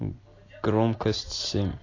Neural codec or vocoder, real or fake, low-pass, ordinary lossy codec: none; real; 7.2 kHz; AAC, 32 kbps